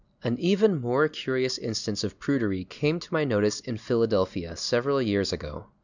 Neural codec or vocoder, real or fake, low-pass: none; real; 7.2 kHz